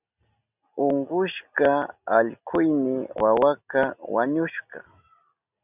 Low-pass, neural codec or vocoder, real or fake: 3.6 kHz; none; real